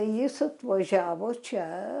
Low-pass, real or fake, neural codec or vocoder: 10.8 kHz; real; none